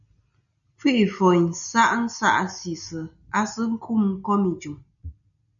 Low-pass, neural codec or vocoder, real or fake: 7.2 kHz; none; real